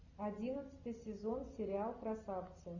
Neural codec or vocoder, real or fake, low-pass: none; real; 7.2 kHz